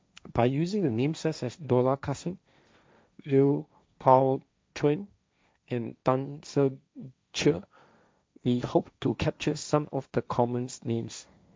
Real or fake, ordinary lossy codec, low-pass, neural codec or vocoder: fake; none; none; codec, 16 kHz, 1.1 kbps, Voila-Tokenizer